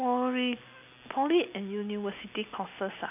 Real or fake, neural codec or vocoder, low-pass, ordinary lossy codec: real; none; 3.6 kHz; AAC, 32 kbps